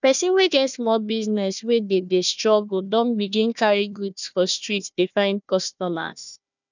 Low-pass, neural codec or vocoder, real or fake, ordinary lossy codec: 7.2 kHz; codec, 16 kHz, 1 kbps, FunCodec, trained on Chinese and English, 50 frames a second; fake; none